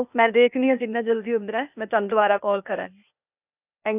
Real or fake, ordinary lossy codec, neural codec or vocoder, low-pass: fake; none; codec, 16 kHz, 0.8 kbps, ZipCodec; 3.6 kHz